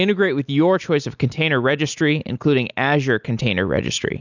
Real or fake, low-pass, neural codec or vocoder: real; 7.2 kHz; none